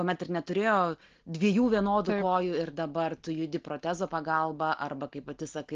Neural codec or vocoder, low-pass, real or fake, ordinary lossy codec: none; 7.2 kHz; real; Opus, 16 kbps